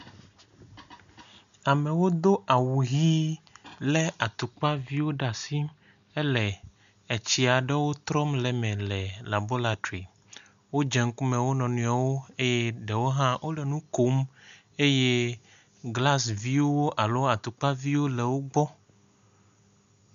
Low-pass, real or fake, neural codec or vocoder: 7.2 kHz; real; none